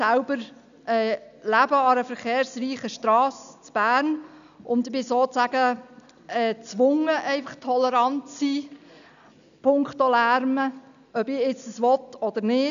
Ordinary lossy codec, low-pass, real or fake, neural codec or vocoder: none; 7.2 kHz; real; none